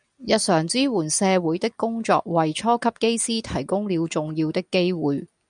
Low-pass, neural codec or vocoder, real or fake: 9.9 kHz; none; real